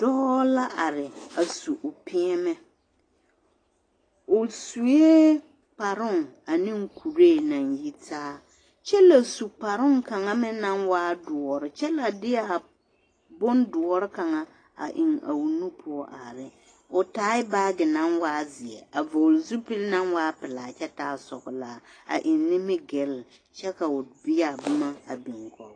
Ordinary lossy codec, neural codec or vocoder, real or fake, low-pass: AAC, 32 kbps; none; real; 9.9 kHz